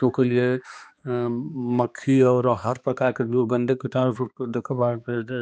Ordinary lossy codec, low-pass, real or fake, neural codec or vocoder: none; none; fake; codec, 16 kHz, 2 kbps, X-Codec, HuBERT features, trained on balanced general audio